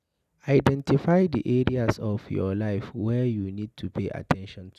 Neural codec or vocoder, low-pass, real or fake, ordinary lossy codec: none; 14.4 kHz; real; none